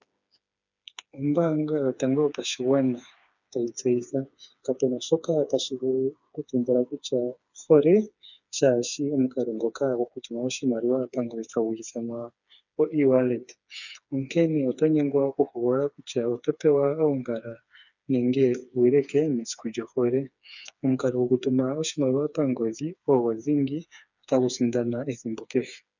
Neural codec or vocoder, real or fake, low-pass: codec, 16 kHz, 4 kbps, FreqCodec, smaller model; fake; 7.2 kHz